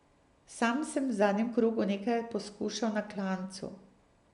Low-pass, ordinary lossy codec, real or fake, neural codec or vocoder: 10.8 kHz; none; real; none